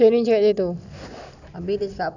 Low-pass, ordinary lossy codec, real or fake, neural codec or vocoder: 7.2 kHz; none; real; none